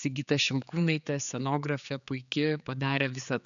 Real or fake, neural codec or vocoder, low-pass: fake; codec, 16 kHz, 4 kbps, X-Codec, HuBERT features, trained on general audio; 7.2 kHz